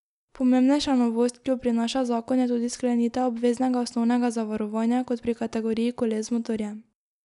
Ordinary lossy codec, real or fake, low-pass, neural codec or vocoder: none; real; 10.8 kHz; none